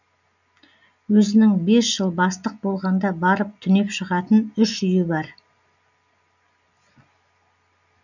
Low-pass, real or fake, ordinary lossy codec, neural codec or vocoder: 7.2 kHz; real; none; none